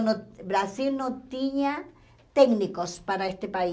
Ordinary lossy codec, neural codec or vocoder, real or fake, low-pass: none; none; real; none